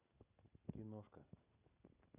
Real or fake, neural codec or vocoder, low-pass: real; none; 3.6 kHz